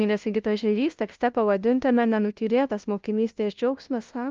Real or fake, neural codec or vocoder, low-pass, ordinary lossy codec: fake; codec, 16 kHz, 0.5 kbps, FunCodec, trained on LibriTTS, 25 frames a second; 7.2 kHz; Opus, 24 kbps